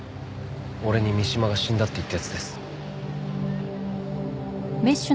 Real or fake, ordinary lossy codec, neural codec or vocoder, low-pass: real; none; none; none